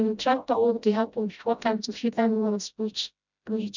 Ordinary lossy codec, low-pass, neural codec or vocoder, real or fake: none; 7.2 kHz; codec, 16 kHz, 0.5 kbps, FreqCodec, smaller model; fake